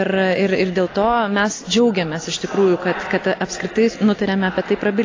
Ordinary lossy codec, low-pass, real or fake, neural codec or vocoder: AAC, 32 kbps; 7.2 kHz; real; none